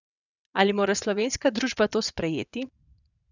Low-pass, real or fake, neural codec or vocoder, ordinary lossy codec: 7.2 kHz; fake; vocoder, 22.05 kHz, 80 mel bands, WaveNeXt; none